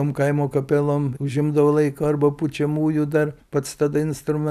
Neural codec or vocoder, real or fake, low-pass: none; real; 14.4 kHz